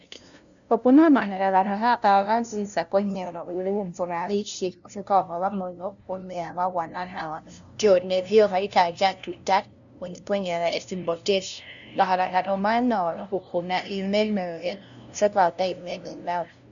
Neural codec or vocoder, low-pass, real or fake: codec, 16 kHz, 0.5 kbps, FunCodec, trained on LibriTTS, 25 frames a second; 7.2 kHz; fake